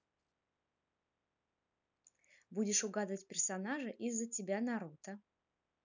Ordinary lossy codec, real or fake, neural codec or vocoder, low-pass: none; real; none; 7.2 kHz